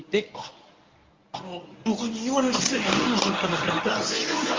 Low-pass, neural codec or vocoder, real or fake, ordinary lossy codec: 7.2 kHz; codec, 24 kHz, 0.9 kbps, WavTokenizer, medium speech release version 1; fake; Opus, 32 kbps